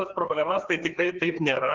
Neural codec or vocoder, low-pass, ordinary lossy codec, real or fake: codec, 16 kHz, 2 kbps, FreqCodec, larger model; 7.2 kHz; Opus, 16 kbps; fake